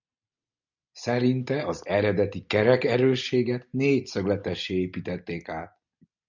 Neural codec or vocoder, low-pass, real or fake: none; 7.2 kHz; real